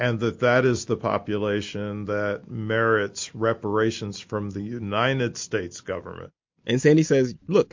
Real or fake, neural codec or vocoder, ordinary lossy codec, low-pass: real; none; MP3, 48 kbps; 7.2 kHz